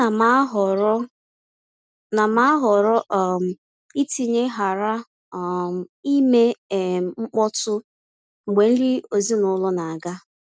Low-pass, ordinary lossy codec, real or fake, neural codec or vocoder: none; none; real; none